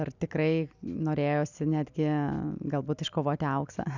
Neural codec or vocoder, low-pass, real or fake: none; 7.2 kHz; real